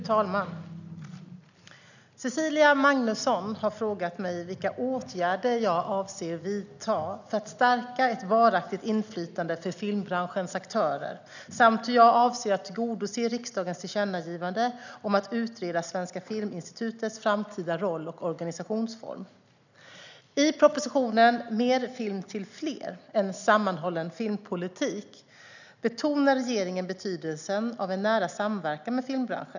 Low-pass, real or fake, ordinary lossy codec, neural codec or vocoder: 7.2 kHz; real; none; none